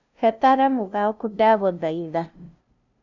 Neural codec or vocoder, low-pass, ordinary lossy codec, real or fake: codec, 16 kHz, 0.5 kbps, FunCodec, trained on LibriTTS, 25 frames a second; 7.2 kHz; none; fake